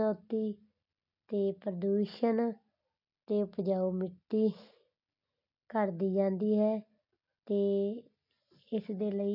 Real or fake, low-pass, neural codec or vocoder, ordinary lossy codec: real; 5.4 kHz; none; none